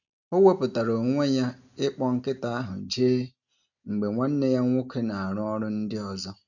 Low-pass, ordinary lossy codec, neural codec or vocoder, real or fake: 7.2 kHz; none; none; real